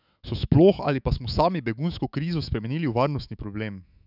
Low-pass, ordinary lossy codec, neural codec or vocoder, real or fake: 5.4 kHz; none; autoencoder, 48 kHz, 128 numbers a frame, DAC-VAE, trained on Japanese speech; fake